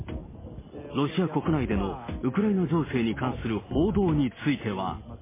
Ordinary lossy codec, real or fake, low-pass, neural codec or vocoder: MP3, 16 kbps; real; 3.6 kHz; none